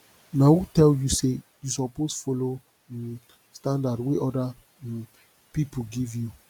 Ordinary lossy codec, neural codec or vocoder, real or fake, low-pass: none; none; real; 19.8 kHz